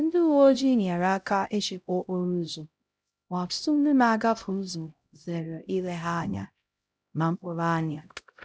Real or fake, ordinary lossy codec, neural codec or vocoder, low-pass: fake; none; codec, 16 kHz, 0.5 kbps, X-Codec, HuBERT features, trained on LibriSpeech; none